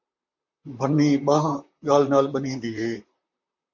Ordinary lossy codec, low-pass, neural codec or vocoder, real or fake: MP3, 64 kbps; 7.2 kHz; vocoder, 44.1 kHz, 128 mel bands, Pupu-Vocoder; fake